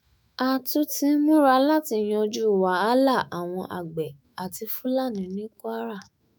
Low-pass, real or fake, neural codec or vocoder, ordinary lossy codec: none; fake; autoencoder, 48 kHz, 128 numbers a frame, DAC-VAE, trained on Japanese speech; none